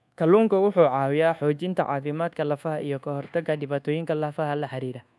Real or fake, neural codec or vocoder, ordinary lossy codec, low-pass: fake; codec, 24 kHz, 1.2 kbps, DualCodec; none; none